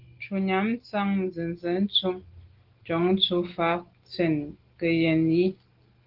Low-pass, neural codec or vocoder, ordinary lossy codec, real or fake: 5.4 kHz; none; Opus, 16 kbps; real